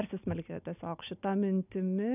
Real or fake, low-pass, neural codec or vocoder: real; 3.6 kHz; none